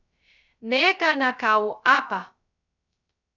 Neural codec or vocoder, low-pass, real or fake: codec, 16 kHz, 0.2 kbps, FocalCodec; 7.2 kHz; fake